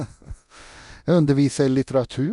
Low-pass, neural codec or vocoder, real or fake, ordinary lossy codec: 10.8 kHz; codec, 24 kHz, 0.9 kbps, DualCodec; fake; none